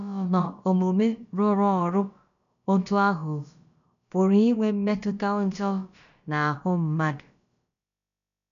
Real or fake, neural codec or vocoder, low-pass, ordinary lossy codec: fake; codec, 16 kHz, about 1 kbps, DyCAST, with the encoder's durations; 7.2 kHz; none